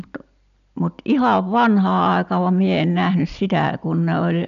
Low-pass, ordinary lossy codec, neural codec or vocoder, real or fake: 7.2 kHz; none; none; real